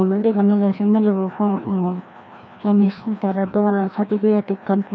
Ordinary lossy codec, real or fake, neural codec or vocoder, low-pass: none; fake; codec, 16 kHz, 1 kbps, FreqCodec, larger model; none